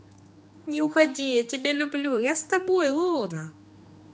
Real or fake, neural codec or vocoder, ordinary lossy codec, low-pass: fake; codec, 16 kHz, 2 kbps, X-Codec, HuBERT features, trained on general audio; none; none